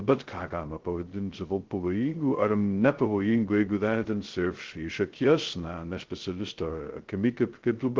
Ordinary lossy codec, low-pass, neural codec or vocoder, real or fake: Opus, 16 kbps; 7.2 kHz; codec, 16 kHz, 0.2 kbps, FocalCodec; fake